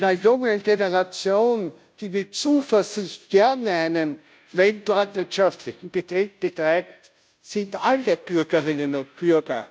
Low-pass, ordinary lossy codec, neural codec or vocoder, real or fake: none; none; codec, 16 kHz, 0.5 kbps, FunCodec, trained on Chinese and English, 25 frames a second; fake